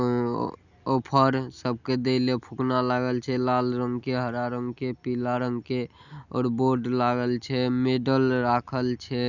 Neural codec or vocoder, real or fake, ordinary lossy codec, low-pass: none; real; none; 7.2 kHz